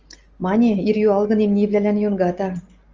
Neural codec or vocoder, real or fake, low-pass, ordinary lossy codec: none; real; 7.2 kHz; Opus, 24 kbps